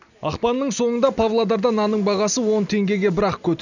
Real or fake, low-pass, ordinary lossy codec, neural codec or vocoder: real; 7.2 kHz; none; none